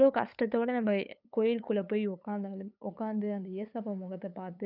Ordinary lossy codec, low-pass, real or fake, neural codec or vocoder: none; 5.4 kHz; fake; codec, 16 kHz, 8 kbps, FunCodec, trained on LibriTTS, 25 frames a second